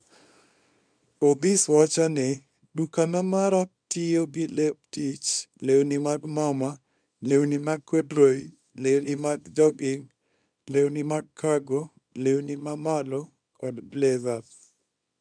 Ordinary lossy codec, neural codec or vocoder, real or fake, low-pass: none; codec, 24 kHz, 0.9 kbps, WavTokenizer, small release; fake; 9.9 kHz